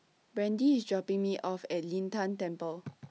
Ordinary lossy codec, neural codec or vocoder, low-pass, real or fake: none; none; none; real